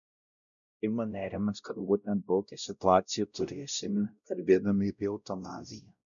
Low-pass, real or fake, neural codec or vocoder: 7.2 kHz; fake; codec, 16 kHz, 0.5 kbps, X-Codec, WavLM features, trained on Multilingual LibriSpeech